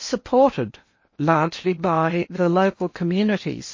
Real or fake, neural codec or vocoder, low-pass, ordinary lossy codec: fake; codec, 16 kHz in and 24 kHz out, 0.8 kbps, FocalCodec, streaming, 65536 codes; 7.2 kHz; MP3, 32 kbps